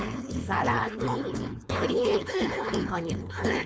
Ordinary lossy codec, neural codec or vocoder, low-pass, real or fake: none; codec, 16 kHz, 4.8 kbps, FACodec; none; fake